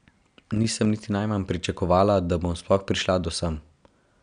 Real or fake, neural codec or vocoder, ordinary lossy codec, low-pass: real; none; none; 9.9 kHz